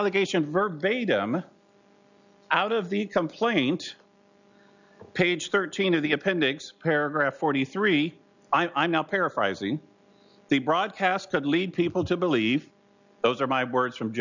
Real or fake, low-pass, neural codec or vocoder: real; 7.2 kHz; none